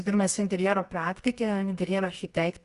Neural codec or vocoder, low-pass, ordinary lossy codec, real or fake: codec, 24 kHz, 0.9 kbps, WavTokenizer, medium music audio release; 10.8 kHz; Opus, 64 kbps; fake